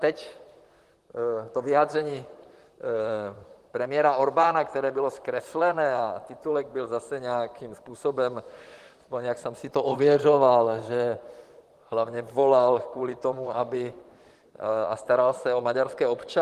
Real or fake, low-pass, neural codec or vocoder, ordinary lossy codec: fake; 14.4 kHz; vocoder, 44.1 kHz, 128 mel bands, Pupu-Vocoder; Opus, 24 kbps